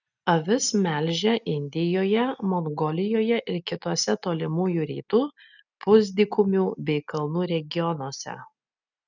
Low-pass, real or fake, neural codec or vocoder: 7.2 kHz; real; none